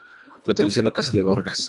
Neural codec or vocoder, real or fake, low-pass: codec, 24 kHz, 1.5 kbps, HILCodec; fake; 10.8 kHz